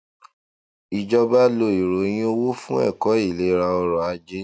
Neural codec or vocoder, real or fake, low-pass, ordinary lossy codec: none; real; none; none